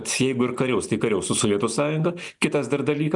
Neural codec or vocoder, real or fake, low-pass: none; real; 10.8 kHz